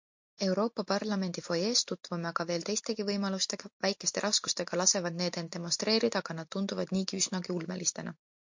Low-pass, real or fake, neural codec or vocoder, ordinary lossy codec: 7.2 kHz; real; none; MP3, 48 kbps